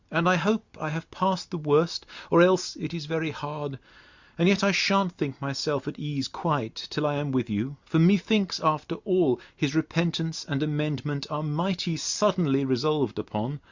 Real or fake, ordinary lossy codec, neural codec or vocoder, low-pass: real; Opus, 64 kbps; none; 7.2 kHz